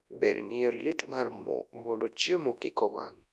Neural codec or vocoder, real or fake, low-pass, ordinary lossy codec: codec, 24 kHz, 0.9 kbps, WavTokenizer, large speech release; fake; none; none